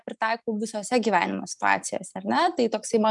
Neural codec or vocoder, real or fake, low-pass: none; real; 10.8 kHz